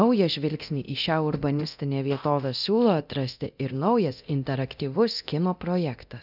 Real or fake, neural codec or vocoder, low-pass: fake; codec, 24 kHz, 0.9 kbps, DualCodec; 5.4 kHz